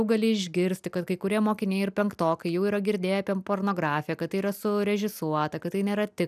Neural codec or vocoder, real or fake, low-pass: none; real; 14.4 kHz